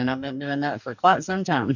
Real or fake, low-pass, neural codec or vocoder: fake; 7.2 kHz; codec, 44.1 kHz, 2.6 kbps, DAC